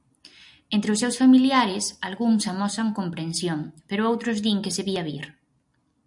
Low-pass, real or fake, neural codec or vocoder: 10.8 kHz; real; none